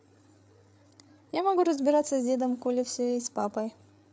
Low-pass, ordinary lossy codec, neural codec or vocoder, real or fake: none; none; codec, 16 kHz, 8 kbps, FreqCodec, larger model; fake